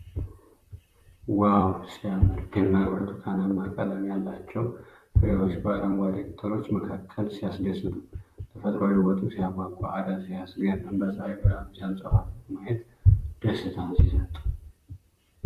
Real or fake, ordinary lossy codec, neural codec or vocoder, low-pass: fake; Opus, 64 kbps; vocoder, 44.1 kHz, 128 mel bands, Pupu-Vocoder; 14.4 kHz